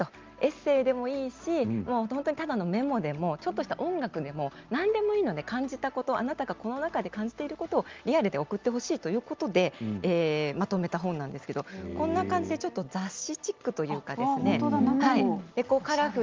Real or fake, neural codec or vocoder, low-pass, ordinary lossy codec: real; none; 7.2 kHz; Opus, 32 kbps